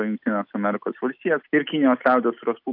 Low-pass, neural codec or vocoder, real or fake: 5.4 kHz; none; real